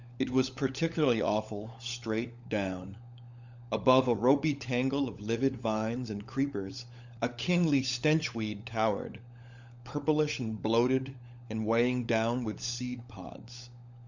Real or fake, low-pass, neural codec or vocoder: fake; 7.2 kHz; codec, 16 kHz, 16 kbps, FunCodec, trained on LibriTTS, 50 frames a second